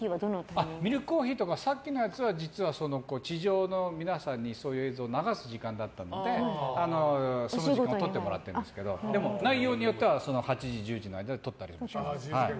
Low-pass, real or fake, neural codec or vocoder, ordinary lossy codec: none; real; none; none